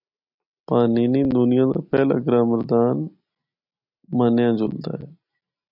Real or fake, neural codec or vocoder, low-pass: real; none; 5.4 kHz